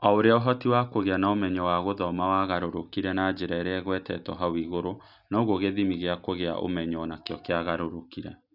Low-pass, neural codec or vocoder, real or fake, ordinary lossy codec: 5.4 kHz; none; real; none